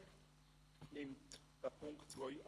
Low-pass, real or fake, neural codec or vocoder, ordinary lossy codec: none; fake; codec, 24 kHz, 3 kbps, HILCodec; none